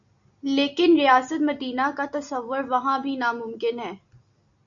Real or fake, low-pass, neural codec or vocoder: real; 7.2 kHz; none